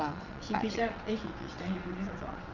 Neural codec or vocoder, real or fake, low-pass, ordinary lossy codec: vocoder, 22.05 kHz, 80 mel bands, WaveNeXt; fake; 7.2 kHz; none